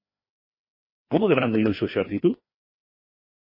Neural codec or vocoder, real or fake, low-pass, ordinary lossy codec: codec, 16 kHz, 2 kbps, FreqCodec, larger model; fake; 5.4 kHz; MP3, 24 kbps